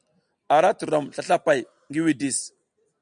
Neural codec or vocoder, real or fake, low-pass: none; real; 9.9 kHz